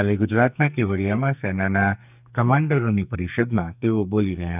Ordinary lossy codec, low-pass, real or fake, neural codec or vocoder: none; 3.6 kHz; fake; codec, 44.1 kHz, 2.6 kbps, SNAC